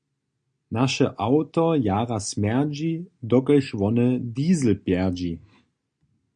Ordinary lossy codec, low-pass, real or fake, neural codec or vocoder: MP3, 64 kbps; 9.9 kHz; real; none